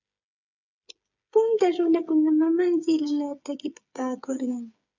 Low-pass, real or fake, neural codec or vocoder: 7.2 kHz; fake; codec, 16 kHz, 8 kbps, FreqCodec, smaller model